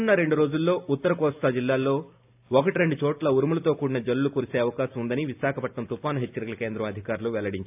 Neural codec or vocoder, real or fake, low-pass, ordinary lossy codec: none; real; 3.6 kHz; AAC, 32 kbps